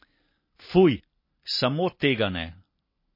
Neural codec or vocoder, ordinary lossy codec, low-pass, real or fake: none; MP3, 24 kbps; 5.4 kHz; real